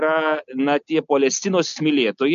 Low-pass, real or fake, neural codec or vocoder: 7.2 kHz; real; none